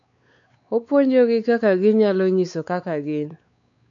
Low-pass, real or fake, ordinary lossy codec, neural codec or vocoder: 7.2 kHz; fake; none; codec, 16 kHz, 4 kbps, X-Codec, WavLM features, trained on Multilingual LibriSpeech